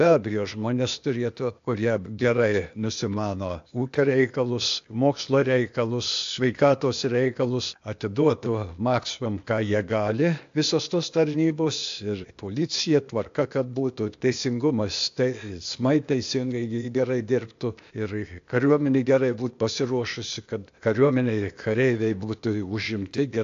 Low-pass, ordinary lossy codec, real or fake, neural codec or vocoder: 7.2 kHz; AAC, 64 kbps; fake; codec, 16 kHz, 0.8 kbps, ZipCodec